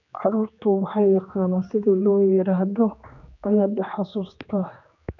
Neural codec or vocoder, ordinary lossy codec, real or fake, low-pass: codec, 16 kHz, 2 kbps, X-Codec, HuBERT features, trained on general audio; none; fake; 7.2 kHz